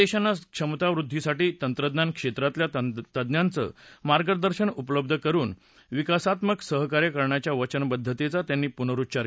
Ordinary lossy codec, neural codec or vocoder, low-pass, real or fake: none; none; none; real